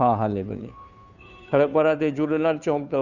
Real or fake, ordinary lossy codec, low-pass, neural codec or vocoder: fake; none; 7.2 kHz; codec, 16 kHz, 2 kbps, FunCodec, trained on Chinese and English, 25 frames a second